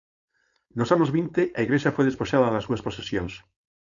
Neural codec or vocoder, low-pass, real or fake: codec, 16 kHz, 4.8 kbps, FACodec; 7.2 kHz; fake